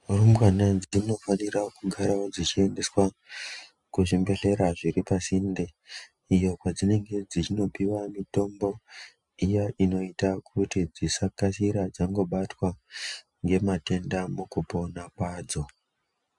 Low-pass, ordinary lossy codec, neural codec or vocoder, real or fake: 10.8 kHz; MP3, 96 kbps; none; real